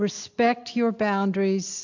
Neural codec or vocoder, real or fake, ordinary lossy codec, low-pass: none; real; AAC, 48 kbps; 7.2 kHz